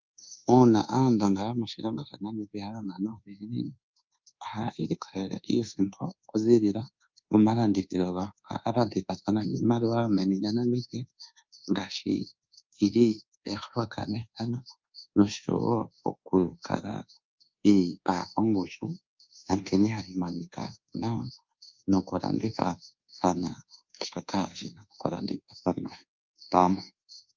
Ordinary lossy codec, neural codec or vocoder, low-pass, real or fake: Opus, 24 kbps; codec, 24 kHz, 1.2 kbps, DualCodec; 7.2 kHz; fake